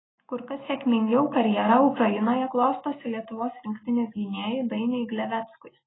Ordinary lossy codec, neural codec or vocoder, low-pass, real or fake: AAC, 16 kbps; none; 7.2 kHz; real